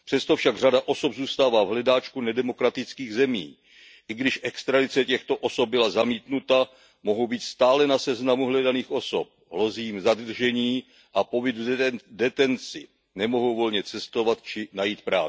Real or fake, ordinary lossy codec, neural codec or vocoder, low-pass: real; none; none; none